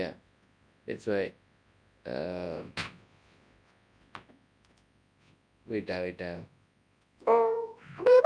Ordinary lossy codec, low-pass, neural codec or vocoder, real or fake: none; 9.9 kHz; codec, 24 kHz, 0.9 kbps, WavTokenizer, large speech release; fake